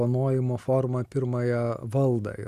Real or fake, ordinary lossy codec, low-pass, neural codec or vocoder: real; Opus, 64 kbps; 14.4 kHz; none